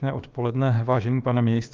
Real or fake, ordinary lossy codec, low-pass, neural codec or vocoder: fake; Opus, 24 kbps; 7.2 kHz; codec, 16 kHz, about 1 kbps, DyCAST, with the encoder's durations